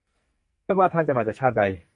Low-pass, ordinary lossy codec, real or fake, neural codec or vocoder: 10.8 kHz; MP3, 48 kbps; fake; codec, 44.1 kHz, 2.6 kbps, SNAC